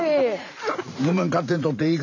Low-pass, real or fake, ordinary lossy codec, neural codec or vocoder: 7.2 kHz; fake; none; vocoder, 44.1 kHz, 128 mel bands every 512 samples, BigVGAN v2